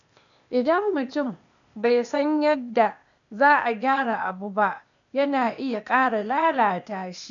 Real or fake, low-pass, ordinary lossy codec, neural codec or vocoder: fake; 7.2 kHz; none; codec, 16 kHz, 0.8 kbps, ZipCodec